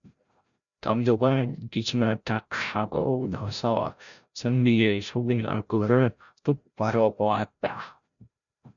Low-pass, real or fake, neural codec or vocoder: 7.2 kHz; fake; codec, 16 kHz, 0.5 kbps, FreqCodec, larger model